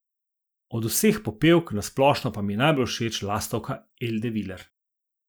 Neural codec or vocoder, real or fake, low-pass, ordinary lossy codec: none; real; none; none